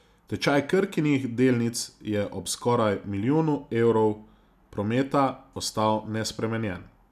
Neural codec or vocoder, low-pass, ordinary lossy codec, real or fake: none; 14.4 kHz; none; real